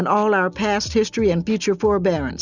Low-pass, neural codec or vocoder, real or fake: 7.2 kHz; none; real